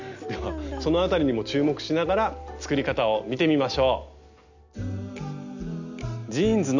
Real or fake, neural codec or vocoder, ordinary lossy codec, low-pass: real; none; none; 7.2 kHz